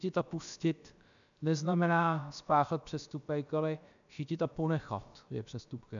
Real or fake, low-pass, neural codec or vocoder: fake; 7.2 kHz; codec, 16 kHz, about 1 kbps, DyCAST, with the encoder's durations